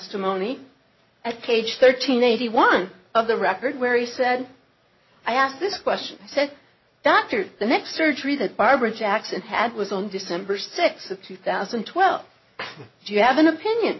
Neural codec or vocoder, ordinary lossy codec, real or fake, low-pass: none; MP3, 24 kbps; real; 7.2 kHz